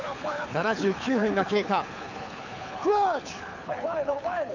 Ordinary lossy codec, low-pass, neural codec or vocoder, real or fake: none; 7.2 kHz; codec, 24 kHz, 6 kbps, HILCodec; fake